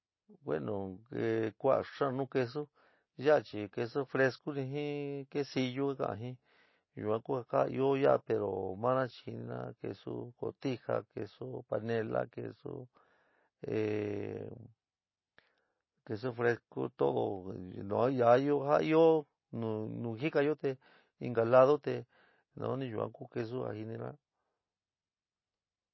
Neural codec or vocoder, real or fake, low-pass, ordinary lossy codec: none; real; 7.2 kHz; MP3, 24 kbps